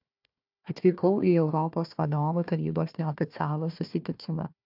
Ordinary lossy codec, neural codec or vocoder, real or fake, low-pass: AAC, 48 kbps; codec, 16 kHz, 1 kbps, FunCodec, trained on Chinese and English, 50 frames a second; fake; 5.4 kHz